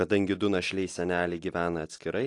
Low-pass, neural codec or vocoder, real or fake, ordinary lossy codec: 10.8 kHz; none; real; AAC, 48 kbps